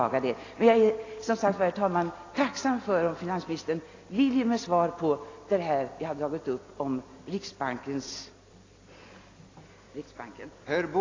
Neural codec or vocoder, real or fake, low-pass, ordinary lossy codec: none; real; 7.2 kHz; AAC, 32 kbps